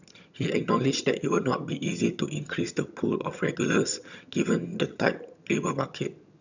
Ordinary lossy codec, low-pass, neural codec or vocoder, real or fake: none; 7.2 kHz; vocoder, 22.05 kHz, 80 mel bands, HiFi-GAN; fake